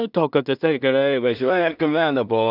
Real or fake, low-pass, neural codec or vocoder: fake; 5.4 kHz; codec, 16 kHz in and 24 kHz out, 0.4 kbps, LongCat-Audio-Codec, two codebook decoder